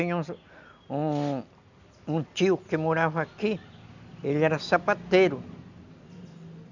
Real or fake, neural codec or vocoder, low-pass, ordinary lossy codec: real; none; 7.2 kHz; none